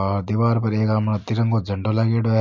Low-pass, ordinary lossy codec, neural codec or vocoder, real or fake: 7.2 kHz; MP3, 48 kbps; none; real